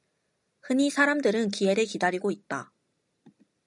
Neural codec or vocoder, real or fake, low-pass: none; real; 10.8 kHz